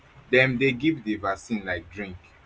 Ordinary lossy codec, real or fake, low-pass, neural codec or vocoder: none; real; none; none